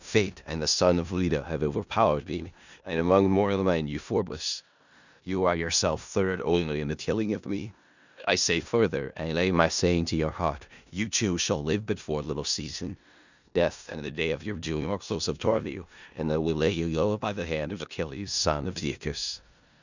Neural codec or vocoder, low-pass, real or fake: codec, 16 kHz in and 24 kHz out, 0.4 kbps, LongCat-Audio-Codec, four codebook decoder; 7.2 kHz; fake